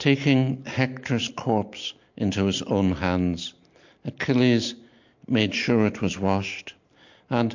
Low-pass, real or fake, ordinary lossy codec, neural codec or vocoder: 7.2 kHz; real; MP3, 48 kbps; none